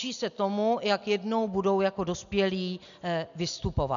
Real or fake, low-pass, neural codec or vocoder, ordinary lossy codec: real; 7.2 kHz; none; MP3, 96 kbps